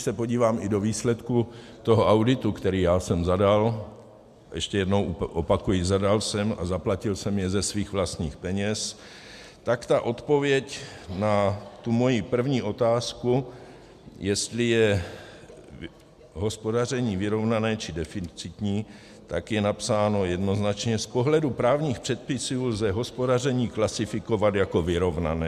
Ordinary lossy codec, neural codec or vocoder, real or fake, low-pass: MP3, 96 kbps; none; real; 14.4 kHz